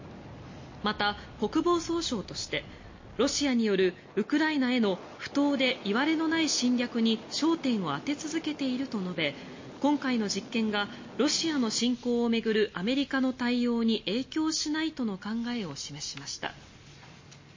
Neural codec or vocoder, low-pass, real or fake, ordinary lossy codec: none; 7.2 kHz; real; MP3, 32 kbps